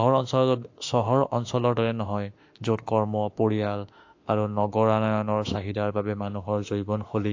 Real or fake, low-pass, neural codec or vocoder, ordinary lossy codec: fake; 7.2 kHz; autoencoder, 48 kHz, 32 numbers a frame, DAC-VAE, trained on Japanese speech; AAC, 48 kbps